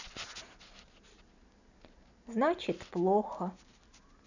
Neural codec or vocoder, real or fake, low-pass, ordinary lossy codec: none; real; 7.2 kHz; none